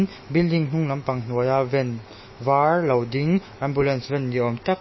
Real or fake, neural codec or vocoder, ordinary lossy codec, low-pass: fake; autoencoder, 48 kHz, 128 numbers a frame, DAC-VAE, trained on Japanese speech; MP3, 24 kbps; 7.2 kHz